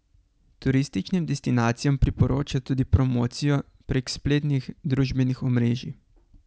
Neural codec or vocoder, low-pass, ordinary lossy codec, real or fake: none; none; none; real